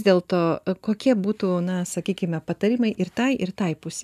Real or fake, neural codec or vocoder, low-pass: real; none; 14.4 kHz